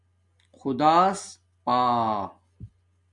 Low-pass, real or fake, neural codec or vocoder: 10.8 kHz; real; none